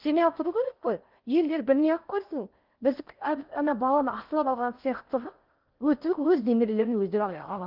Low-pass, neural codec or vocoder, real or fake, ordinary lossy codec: 5.4 kHz; codec, 16 kHz in and 24 kHz out, 0.6 kbps, FocalCodec, streaming, 4096 codes; fake; Opus, 24 kbps